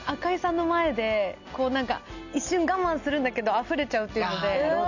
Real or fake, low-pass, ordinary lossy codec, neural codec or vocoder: real; 7.2 kHz; none; none